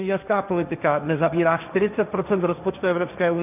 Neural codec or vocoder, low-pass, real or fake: codec, 16 kHz, 1.1 kbps, Voila-Tokenizer; 3.6 kHz; fake